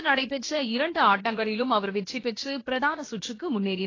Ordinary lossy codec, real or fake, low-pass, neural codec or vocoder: AAC, 32 kbps; fake; 7.2 kHz; codec, 16 kHz, about 1 kbps, DyCAST, with the encoder's durations